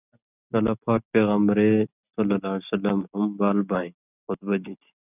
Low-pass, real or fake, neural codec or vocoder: 3.6 kHz; real; none